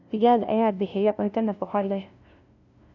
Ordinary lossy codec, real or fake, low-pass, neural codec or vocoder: none; fake; 7.2 kHz; codec, 16 kHz, 0.5 kbps, FunCodec, trained on LibriTTS, 25 frames a second